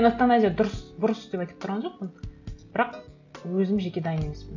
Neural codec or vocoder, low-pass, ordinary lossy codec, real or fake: none; 7.2 kHz; none; real